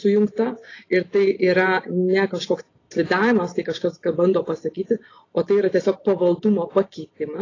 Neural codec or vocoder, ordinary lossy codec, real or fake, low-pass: none; AAC, 32 kbps; real; 7.2 kHz